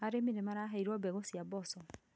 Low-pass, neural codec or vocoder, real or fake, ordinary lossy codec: none; none; real; none